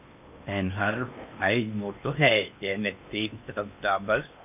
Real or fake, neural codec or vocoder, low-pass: fake; codec, 16 kHz in and 24 kHz out, 0.8 kbps, FocalCodec, streaming, 65536 codes; 3.6 kHz